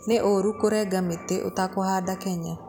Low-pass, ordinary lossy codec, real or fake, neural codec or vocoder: none; none; real; none